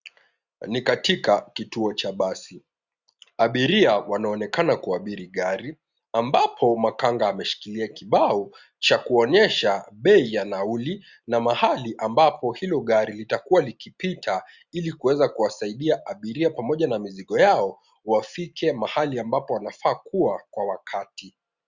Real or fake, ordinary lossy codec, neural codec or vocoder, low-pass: real; Opus, 64 kbps; none; 7.2 kHz